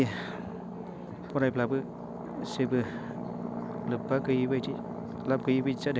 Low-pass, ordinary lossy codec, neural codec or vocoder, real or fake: none; none; none; real